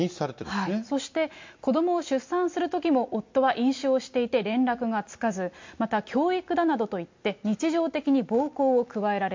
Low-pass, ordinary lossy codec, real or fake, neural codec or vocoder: 7.2 kHz; MP3, 48 kbps; real; none